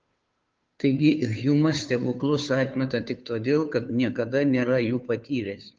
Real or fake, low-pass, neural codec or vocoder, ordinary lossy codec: fake; 7.2 kHz; codec, 16 kHz, 2 kbps, FunCodec, trained on Chinese and English, 25 frames a second; Opus, 24 kbps